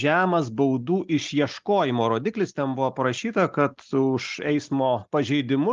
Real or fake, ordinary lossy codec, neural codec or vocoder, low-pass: real; Opus, 32 kbps; none; 7.2 kHz